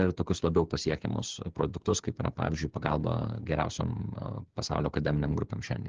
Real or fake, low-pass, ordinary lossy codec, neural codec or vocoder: fake; 7.2 kHz; Opus, 16 kbps; codec, 16 kHz, 8 kbps, FreqCodec, smaller model